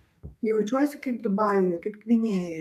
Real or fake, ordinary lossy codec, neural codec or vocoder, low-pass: fake; MP3, 96 kbps; codec, 32 kHz, 1.9 kbps, SNAC; 14.4 kHz